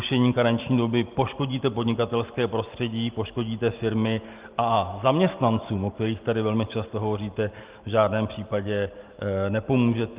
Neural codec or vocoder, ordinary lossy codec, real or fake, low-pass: none; Opus, 32 kbps; real; 3.6 kHz